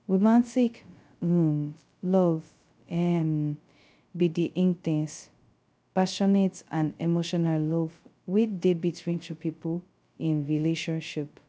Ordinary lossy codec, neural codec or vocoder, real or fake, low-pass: none; codec, 16 kHz, 0.2 kbps, FocalCodec; fake; none